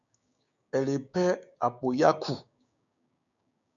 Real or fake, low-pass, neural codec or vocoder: fake; 7.2 kHz; codec, 16 kHz, 6 kbps, DAC